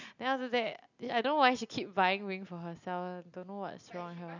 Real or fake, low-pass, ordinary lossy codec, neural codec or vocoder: real; 7.2 kHz; none; none